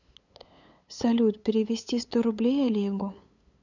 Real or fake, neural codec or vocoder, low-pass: fake; codec, 16 kHz, 8 kbps, FunCodec, trained on LibriTTS, 25 frames a second; 7.2 kHz